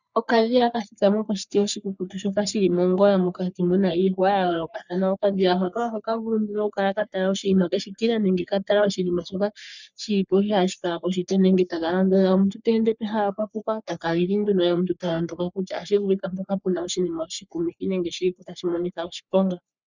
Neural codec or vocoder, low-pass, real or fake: codec, 44.1 kHz, 3.4 kbps, Pupu-Codec; 7.2 kHz; fake